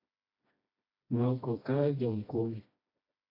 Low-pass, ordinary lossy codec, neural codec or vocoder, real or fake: 5.4 kHz; AAC, 32 kbps; codec, 16 kHz, 1 kbps, FreqCodec, smaller model; fake